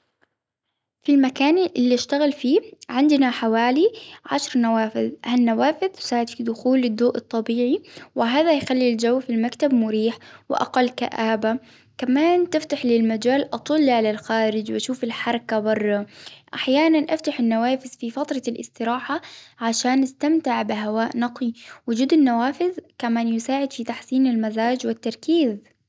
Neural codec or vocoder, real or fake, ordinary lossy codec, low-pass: none; real; none; none